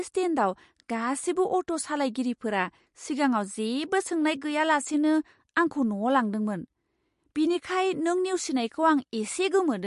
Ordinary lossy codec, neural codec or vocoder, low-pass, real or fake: MP3, 48 kbps; none; 14.4 kHz; real